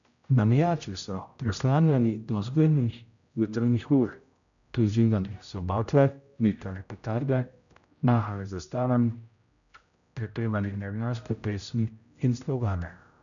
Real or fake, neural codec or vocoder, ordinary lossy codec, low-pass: fake; codec, 16 kHz, 0.5 kbps, X-Codec, HuBERT features, trained on general audio; none; 7.2 kHz